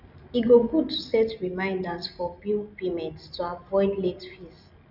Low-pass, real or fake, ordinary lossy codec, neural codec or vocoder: 5.4 kHz; real; none; none